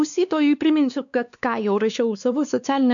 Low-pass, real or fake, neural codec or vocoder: 7.2 kHz; fake; codec, 16 kHz, 2 kbps, X-Codec, WavLM features, trained on Multilingual LibriSpeech